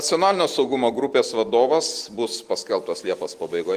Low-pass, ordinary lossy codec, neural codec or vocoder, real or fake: 14.4 kHz; Opus, 24 kbps; none; real